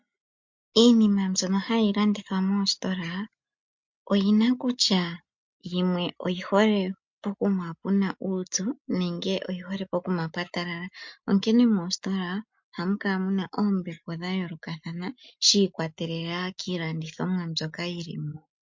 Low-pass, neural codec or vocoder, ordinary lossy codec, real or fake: 7.2 kHz; none; MP3, 48 kbps; real